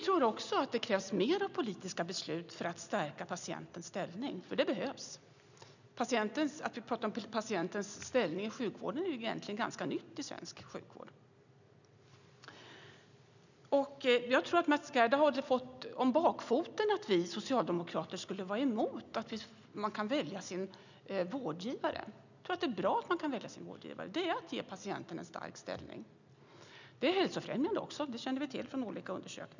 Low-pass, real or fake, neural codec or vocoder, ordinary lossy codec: 7.2 kHz; real; none; none